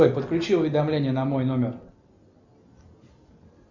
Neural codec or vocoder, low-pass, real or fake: none; 7.2 kHz; real